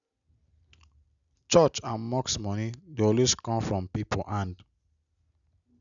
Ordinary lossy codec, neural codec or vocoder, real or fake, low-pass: none; none; real; 7.2 kHz